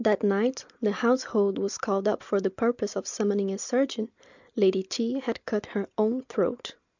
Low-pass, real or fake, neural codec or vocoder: 7.2 kHz; real; none